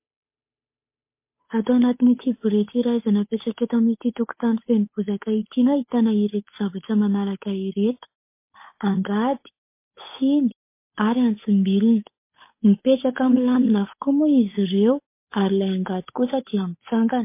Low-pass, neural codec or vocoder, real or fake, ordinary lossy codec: 3.6 kHz; codec, 16 kHz, 8 kbps, FunCodec, trained on Chinese and English, 25 frames a second; fake; MP3, 24 kbps